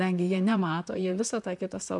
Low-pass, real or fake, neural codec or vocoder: 10.8 kHz; fake; vocoder, 44.1 kHz, 128 mel bands, Pupu-Vocoder